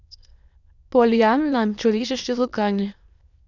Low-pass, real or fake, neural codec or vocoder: 7.2 kHz; fake; autoencoder, 22.05 kHz, a latent of 192 numbers a frame, VITS, trained on many speakers